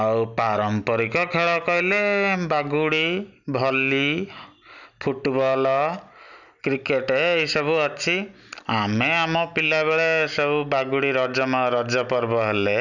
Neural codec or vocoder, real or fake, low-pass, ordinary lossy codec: none; real; 7.2 kHz; none